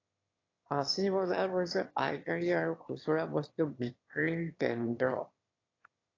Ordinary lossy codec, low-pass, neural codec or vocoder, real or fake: AAC, 32 kbps; 7.2 kHz; autoencoder, 22.05 kHz, a latent of 192 numbers a frame, VITS, trained on one speaker; fake